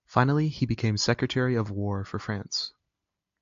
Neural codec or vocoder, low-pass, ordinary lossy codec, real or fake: none; 7.2 kHz; AAC, 64 kbps; real